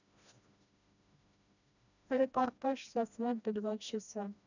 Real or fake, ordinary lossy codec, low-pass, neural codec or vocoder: fake; none; 7.2 kHz; codec, 16 kHz, 1 kbps, FreqCodec, smaller model